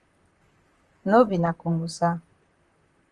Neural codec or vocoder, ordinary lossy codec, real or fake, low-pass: vocoder, 44.1 kHz, 128 mel bands, Pupu-Vocoder; Opus, 24 kbps; fake; 10.8 kHz